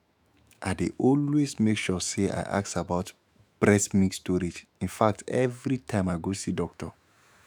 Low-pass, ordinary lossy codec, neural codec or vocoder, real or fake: none; none; autoencoder, 48 kHz, 128 numbers a frame, DAC-VAE, trained on Japanese speech; fake